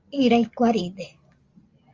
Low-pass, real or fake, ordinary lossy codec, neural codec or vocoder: 7.2 kHz; real; Opus, 32 kbps; none